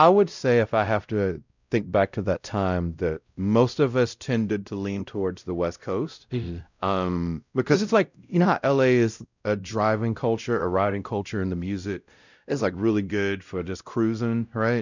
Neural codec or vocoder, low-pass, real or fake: codec, 16 kHz, 0.5 kbps, X-Codec, WavLM features, trained on Multilingual LibriSpeech; 7.2 kHz; fake